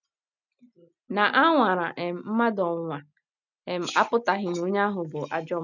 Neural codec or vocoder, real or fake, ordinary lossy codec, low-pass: none; real; none; 7.2 kHz